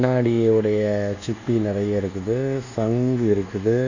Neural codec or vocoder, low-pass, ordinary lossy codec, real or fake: codec, 24 kHz, 1.2 kbps, DualCodec; 7.2 kHz; none; fake